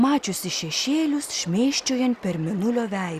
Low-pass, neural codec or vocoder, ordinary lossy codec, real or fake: 14.4 kHz; none; Opus, 64 kbps; real